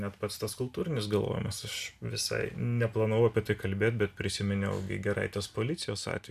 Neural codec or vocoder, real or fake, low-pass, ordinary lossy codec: none; real; 14.4 kHz; MP3, 96 kbps